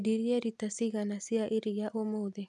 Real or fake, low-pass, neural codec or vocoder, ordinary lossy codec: real; none; none; none